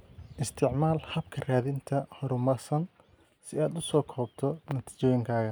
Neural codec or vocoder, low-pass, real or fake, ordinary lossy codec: none; none; real; none